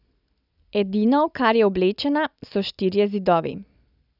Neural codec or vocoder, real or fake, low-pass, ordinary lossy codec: none; real; 5.4 kHz; none